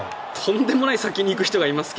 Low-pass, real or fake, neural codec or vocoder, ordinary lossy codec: none; real; none; none